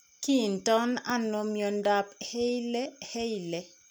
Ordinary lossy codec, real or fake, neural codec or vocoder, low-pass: none; real; none; none